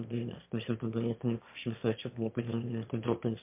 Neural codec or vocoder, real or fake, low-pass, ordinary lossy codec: autoencoder, 22.05 kHz, a latent of 192 numbers a frame, VITS, trained on one speaker; fake; 3.6 kHz; MP3, 32 kbps